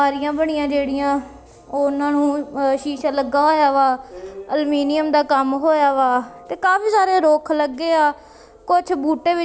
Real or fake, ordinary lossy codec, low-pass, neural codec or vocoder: real; none; none; none